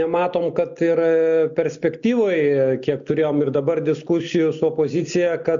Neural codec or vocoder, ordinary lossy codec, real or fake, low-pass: none; MP3, 64 kbps; real; 7.2 kHz